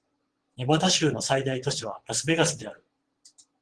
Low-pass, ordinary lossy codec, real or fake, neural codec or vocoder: 9.9 kHz; Opus, 16 kbps; fake; vocoder, 22.05 kHz, 80 mel bands, WaveNeXt